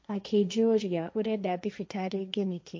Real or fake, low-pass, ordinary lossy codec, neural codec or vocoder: fake; none; none; codec, 16 kHz, 1.1 kbps, Voila-Tokenizer